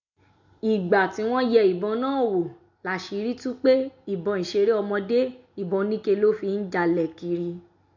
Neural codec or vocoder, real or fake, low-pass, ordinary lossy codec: none; real; 7.2 kHz; MP3, 64 kbps